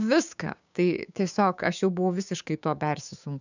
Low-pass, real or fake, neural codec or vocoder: 7.2 kHz; fake; codec, 16 kHz, 6 kbps, DAC